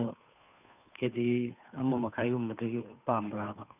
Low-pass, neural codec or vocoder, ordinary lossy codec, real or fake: 3.6 kHz; codec, 16 kHz, 2 kbps, FunCodec, trained on Chinese and English, 25 frames a second; none; fake